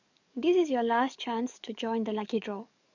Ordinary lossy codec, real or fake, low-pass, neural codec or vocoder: none; fake; 7.2 kHz; codec, 44.1 kHz, 7.8 kbps, DAC